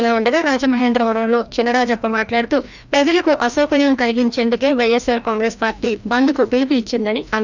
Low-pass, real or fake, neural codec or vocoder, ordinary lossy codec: 7.2 kHz; fake; codec, 16 kHz, 1 kbps, FreqCodec, larger model; none